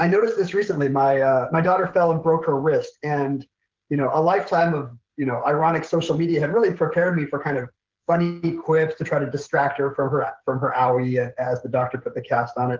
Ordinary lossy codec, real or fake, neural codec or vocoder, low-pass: Opus, 16 kbps; fake; codec, 16 kHz, 16 kbps, FreqCodec, smaller model; 7.2 kHz